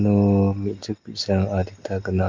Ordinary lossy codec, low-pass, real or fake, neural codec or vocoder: Opus, 24 kbps; 7.2 kHz; real; none